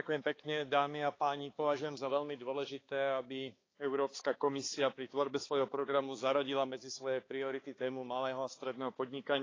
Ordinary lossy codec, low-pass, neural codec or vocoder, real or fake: AAC, 32 kbps; 7.2 kHz; codec, 16 kHz, 4 kbps, X-Codec, HuBERT features, trained on balanced general audio; fake